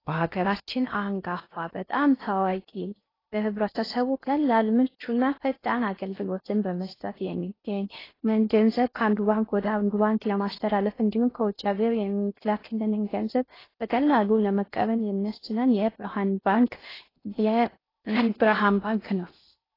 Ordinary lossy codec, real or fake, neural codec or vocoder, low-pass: AAC, 24 kbps; fake; codec, 16 kHz in and 24 kHz out, 0.6 kbps, FocalCodec, streaming, 4096 codes; 5.4 kHz